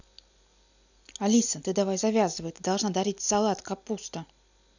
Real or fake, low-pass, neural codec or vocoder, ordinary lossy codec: real; 7.2 kHz; none; none